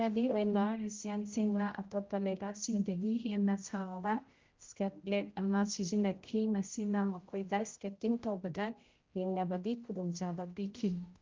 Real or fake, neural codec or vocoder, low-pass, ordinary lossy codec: fake; codec, 16 kHz, 0.5 kbps, X-Codec, HuBERT features, trained on general audio; 7.2 kHz; Opus, 32 kbps